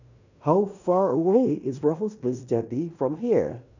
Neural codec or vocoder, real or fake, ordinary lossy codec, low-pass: codec, 16 kHz in and 24 kHz out, 0.9 kbps, LongCat-Audio-Codec, fine tuned four codebook decoder; fake; none; 7.2 kHz